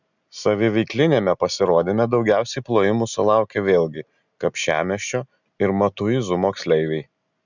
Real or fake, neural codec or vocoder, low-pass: real; none; 7.2 kHz